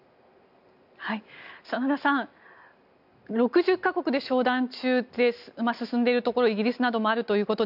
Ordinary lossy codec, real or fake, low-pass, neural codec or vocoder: none; real; 5.4 kHz; none